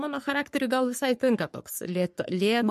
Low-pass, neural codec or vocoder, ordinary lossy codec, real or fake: 14.4 kHz; codec, 44.1 kHz, 3.4 kbps, Pupu-Codec; MP3, 64 kbps; fake